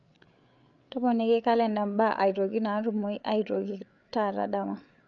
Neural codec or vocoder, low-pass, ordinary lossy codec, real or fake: codec, 16 kHz, 8 kbps, FreqCodec, larger model; 7.2 kHz; none; fake